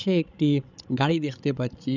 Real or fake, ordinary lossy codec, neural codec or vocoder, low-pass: fake; none; codec, 16 kHz, 16 kbps, FreqCodec, larger model; 7.2 kHz